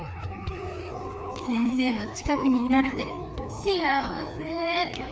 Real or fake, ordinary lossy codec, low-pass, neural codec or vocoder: fake; none; none; codec, 16 kHz, 2 kbps, FreqCodec, larger model